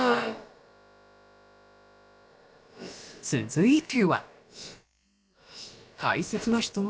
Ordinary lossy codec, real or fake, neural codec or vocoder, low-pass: none; fake; codec, 16 kHz, about 1 kbps, DyCAST, with the encoder's durations; none